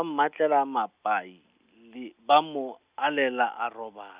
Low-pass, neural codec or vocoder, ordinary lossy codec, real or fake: 3.6 kHz; none; Opus, 64 kbps; real